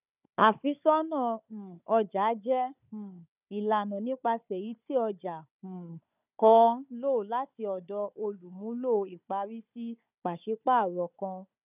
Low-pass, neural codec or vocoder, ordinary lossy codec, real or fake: 3.6 kHz; codec, 16 kHz, 4 kbps, FunCodec, trained on Chinese and English, 50 frames a second; none; fake